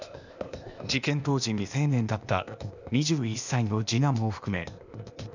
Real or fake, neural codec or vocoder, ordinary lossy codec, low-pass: fake; codec, 16 kHz, 0.8 kbps, ZipCodec; none; 7.2 kHz